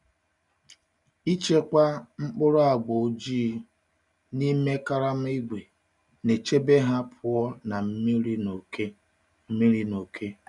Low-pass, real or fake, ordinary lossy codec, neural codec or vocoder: 10.8 kHz; real; none; none